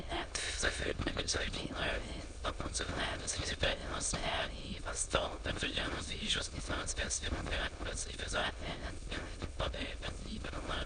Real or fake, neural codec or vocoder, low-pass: fake; autoencoder, 22.05 kHz, a latent of 192 numbers a frame, VITS, trained on many speakers; 9.9 kHz